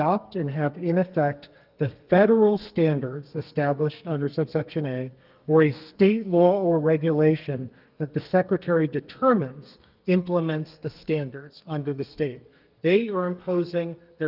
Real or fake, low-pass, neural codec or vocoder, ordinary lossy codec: fake; 5.4 kHz; codec, 32 kHz, 1.9 kbps, SNAC; Opus, 32 kbps